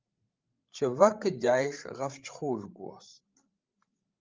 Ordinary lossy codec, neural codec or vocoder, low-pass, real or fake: Opus, 24 kbps; codec, 16 kHz, 16 kbps, FreqCodec, larger model; 7.2 kHz; fake